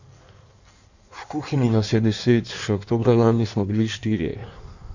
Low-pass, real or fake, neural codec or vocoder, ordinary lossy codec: 7.2 kHz; fake; codec, 16 kHz in and 24 kHz out, 1.1 kbps, FireRedTTS-2 codec; none